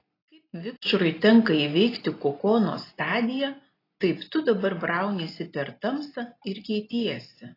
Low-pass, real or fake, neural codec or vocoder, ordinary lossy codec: 5.4 kHz; real; none; AAC, 24 kbps